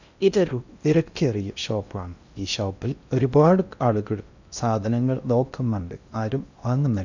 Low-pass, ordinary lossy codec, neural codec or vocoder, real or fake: 7.2 kHz; none; codec, 16 kHz in and 24 kHz out, 0.6 kbps, FocalCodec, streaming, 2048 codes; fake